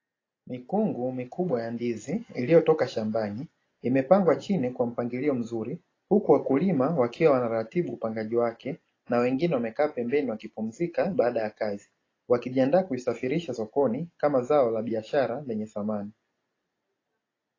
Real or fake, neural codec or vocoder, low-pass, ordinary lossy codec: real; none; 7.2 kHz; AAC, 32 kbps